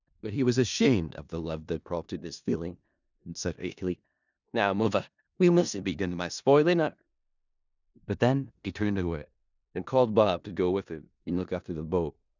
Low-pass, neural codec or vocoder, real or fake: 7.2 kHz; codec, 16 kHz in and 24 kHz out, 0.4 kbps, LongCat-Audio-Codec, four codebook decoder; fake